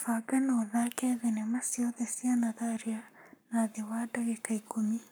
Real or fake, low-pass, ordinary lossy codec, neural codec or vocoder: fake; none; none; codec, 44.1 kHz, 7.8 kbps, Pupu-Codec